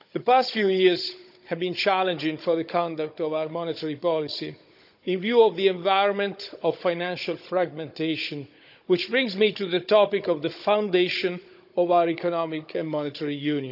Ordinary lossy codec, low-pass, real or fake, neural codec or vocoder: none; 5.4 kHz; fake; codec, 16 kHz, 16 kbps, FunCodec, trained on Chinese and English, 50 frames a second